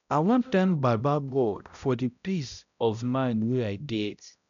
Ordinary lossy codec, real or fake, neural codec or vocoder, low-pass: none; fake; codec, 16 kHz, 0.5 kbps, X-Codec, HuBERT features, trained on balanced general audio; 7.2 kHz